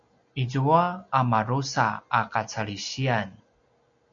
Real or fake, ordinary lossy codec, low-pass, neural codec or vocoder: real; MP3, 64 kbps; 7.2 kHz; none